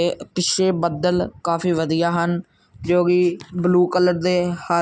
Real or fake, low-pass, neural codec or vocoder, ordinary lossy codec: real; none; none; none